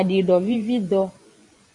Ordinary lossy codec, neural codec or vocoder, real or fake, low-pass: AAC, 48 kbps; vocoder, 24 kHz, 100 mel bands, Vocos; fake; 10.8 kHz